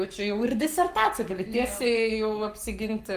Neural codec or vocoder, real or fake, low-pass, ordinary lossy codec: codec, 44.1 kHz, 7.8 kbps, Pupu-Codec; fake; 14.4 kHz; Opus, 32 kbps